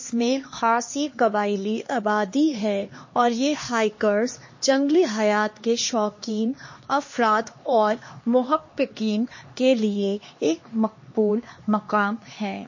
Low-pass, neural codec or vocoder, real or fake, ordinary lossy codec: 7.2 kHz; codec, 16 kHz, 2 kbps, X-Codec, HuBERT features, trained on LibriSpeech; fake; MP3, 32 kbps